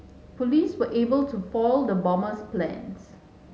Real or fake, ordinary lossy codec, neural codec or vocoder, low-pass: real; none; none; none